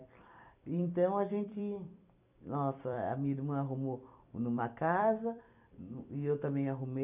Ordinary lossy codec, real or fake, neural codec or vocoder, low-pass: none; fake; vocoder, 44.1 kHz, 128 mel bands every 256 samples, BigVGAN v2; 3.6 kHz